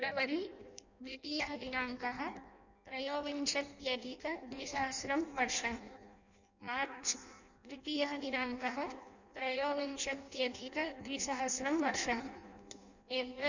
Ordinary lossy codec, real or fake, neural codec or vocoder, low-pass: none; fake; codec, 16 kHz in and 24 kHz out, 0.6 kbps, FireRedTTS-2 codec; 7.2 kHz